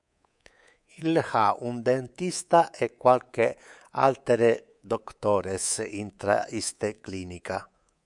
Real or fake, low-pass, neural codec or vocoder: fake; 10.8 kHz; codec, 24 kHz, 3.1 kbps, DualCodec